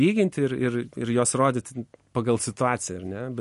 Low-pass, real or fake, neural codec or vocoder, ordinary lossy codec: 14.4 kHz; real; none; MP3, 48 kbps